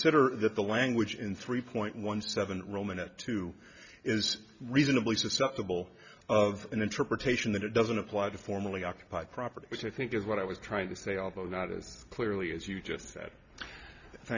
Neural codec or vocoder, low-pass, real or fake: none; 7.2 kHz; real